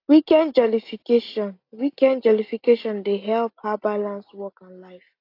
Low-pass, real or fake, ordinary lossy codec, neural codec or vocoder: 5.4 kHz; real; AAC, 32 kbps; none